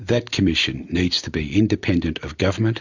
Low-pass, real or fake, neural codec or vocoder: 7.2 kHz; real; none